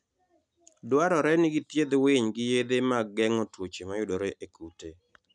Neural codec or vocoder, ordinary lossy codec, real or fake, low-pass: none; none; real; 10.8 kHz